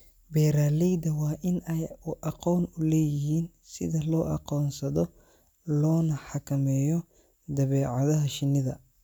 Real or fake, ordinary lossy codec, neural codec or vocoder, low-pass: real; none; none; none